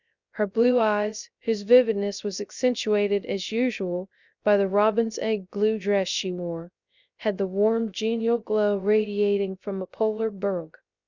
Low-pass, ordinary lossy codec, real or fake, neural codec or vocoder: 7.2 kHz; Opus, 64 kbps; fake; codec, 16 kHz, 0.3 kbps, FocalCodec